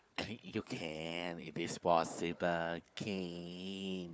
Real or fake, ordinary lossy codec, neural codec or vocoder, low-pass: fake; none; codec, 16 kHz, 4 kbps, FunCodec, trained on Chinese and English, 50 frames a second; none